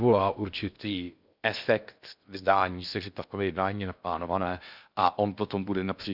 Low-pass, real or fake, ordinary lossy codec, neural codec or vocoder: 5.4 kHz; fake; none; codec, 16 kHz in and 24 kHz out, 0.6 kbps, FocalCodec, streaming, 2048 codes